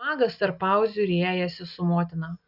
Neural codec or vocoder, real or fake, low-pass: none; real; 5.4 kHz